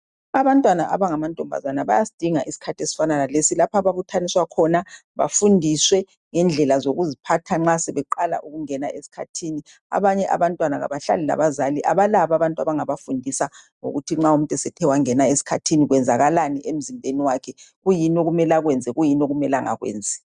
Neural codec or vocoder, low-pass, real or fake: none; 10.8 kHz; real